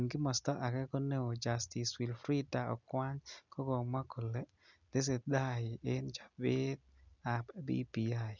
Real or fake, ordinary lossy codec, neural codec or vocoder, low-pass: real; none; none; 7.2 kHz